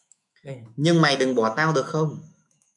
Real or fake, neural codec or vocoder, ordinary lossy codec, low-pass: fake; autoencoder, 48 kHz, 128 numbers a frame, DAC-VAE, trained on Japanese speech; MP3, 96 kbps; 10.8 kHz